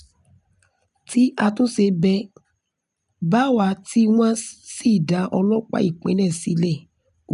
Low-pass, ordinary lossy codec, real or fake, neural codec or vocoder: 10.8 kHz; none; real; none